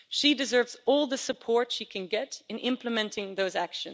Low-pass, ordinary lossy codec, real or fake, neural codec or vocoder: none; none; real; none